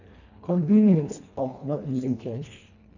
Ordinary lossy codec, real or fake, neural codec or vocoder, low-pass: none; fake; codec, 24 kHz, 1.5 kbps, HILCodec; 7.2 kHz